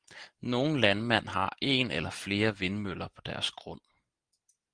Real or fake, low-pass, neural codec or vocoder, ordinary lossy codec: real; 9.9 kHz; none; Opus, 24 kbps